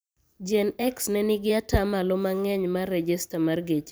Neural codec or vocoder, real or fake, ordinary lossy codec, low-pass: vocoder, 44.1 kHz, 128 mel bands every 512 samples, BigVGAN v2; fake; none; none